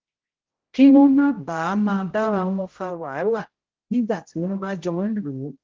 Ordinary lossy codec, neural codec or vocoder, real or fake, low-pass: Opus, 16 kbps; codec, 16 kHz, 0.5 kbps, X-Codec, HuBERT features, trained on general audio; fake; 7.2 kHz